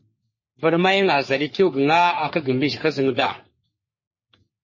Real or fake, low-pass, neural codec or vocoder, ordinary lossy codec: fake; 7.2 kHz; codec, 44.1 kHz, 3.4 kbps, Pupu-Codec; MP3, 32 kbps